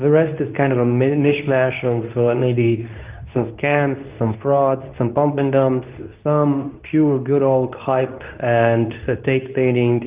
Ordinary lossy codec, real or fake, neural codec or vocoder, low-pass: Opus, 24 kbps; fake; codec, 24 kHz, 0.9 kbps, WavTokenizer, medium speech release version 2; 3.6 kHz